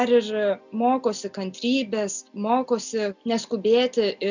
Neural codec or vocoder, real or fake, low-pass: none; real; 7.2 kHz